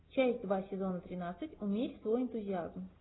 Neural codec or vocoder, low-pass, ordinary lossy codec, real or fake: none; 7.2 kHz; AAC, 16 kbps; real